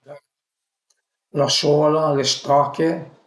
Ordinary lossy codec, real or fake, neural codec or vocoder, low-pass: none; real; none; none